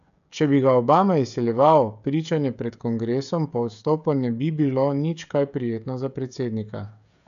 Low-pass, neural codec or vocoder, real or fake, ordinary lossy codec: 7.2 kHz; codec, 16 kHz, 16 kbps, FreqCodec, smaller model; fake; none